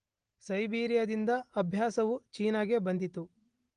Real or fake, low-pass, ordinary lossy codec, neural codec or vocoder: real; 9.9 kHz; Opus, 24 kbps; none